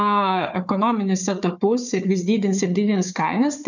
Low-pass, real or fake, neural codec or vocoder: 7.2 kHz; fake; codec, 16 kHz, 4 kbps, FunCodec, trained on Chinese and English, 50 frames a second